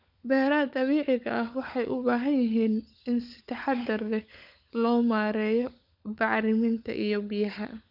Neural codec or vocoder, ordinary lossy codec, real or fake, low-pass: codec, 16 kHz, 16 kbps, FunCodec, trained on LibriTTS, 50 frames a second; none; fake; 5.4 kHz